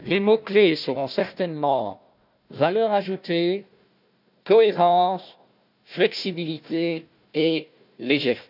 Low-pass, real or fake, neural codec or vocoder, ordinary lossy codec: 5.4 kHz; fake; codec, 16 kHz, 1 kbps, FunCodec, trained on Chinese and English, 50 frames a second; none